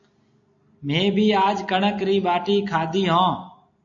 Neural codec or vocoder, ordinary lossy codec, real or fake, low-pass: none; AAC, 48 kbps; real; 7.2 kHz